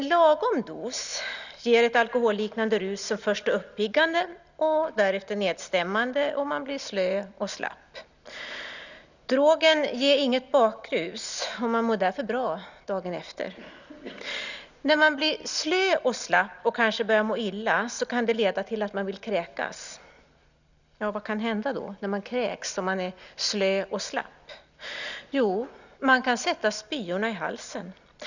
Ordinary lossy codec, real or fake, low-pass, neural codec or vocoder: none; real; 7.2 kHz; none